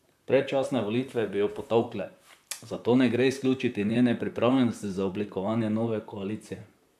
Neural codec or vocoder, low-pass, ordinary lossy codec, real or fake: vocoder, 44.1 kHz, 128 mel bands, Pupu-Vocoder; 14.4 kHz; AAC, 96 kbps; fake